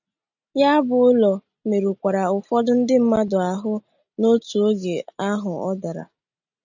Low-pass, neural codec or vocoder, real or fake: 7.2 kHz; none; real